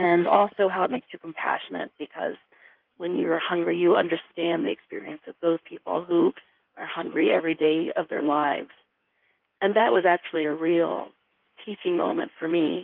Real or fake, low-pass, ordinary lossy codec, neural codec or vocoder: fake; 5.4 kHz; Opus, 24 kbps; codec, 16 kHz in and 24 kHz out, 1.1 kbps, FireRedTTS-2 codec